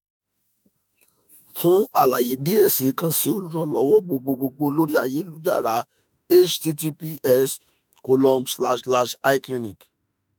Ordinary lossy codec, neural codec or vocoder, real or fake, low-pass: none; autoencoder, 48 kHz, 32 numbers a frame, DAC-VAE, trained on Japanese speech; fake; none